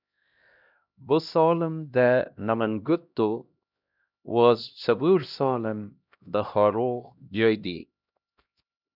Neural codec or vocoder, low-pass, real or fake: codec, 16 kHz, 1 kbps, X-Codec, HuBERT features, trained on LibriSpeech; 5.4 kHz; fake